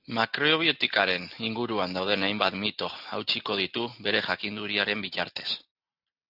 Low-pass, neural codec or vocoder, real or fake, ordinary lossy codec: 5.4 kHz; none; real; AAC, 48 kbps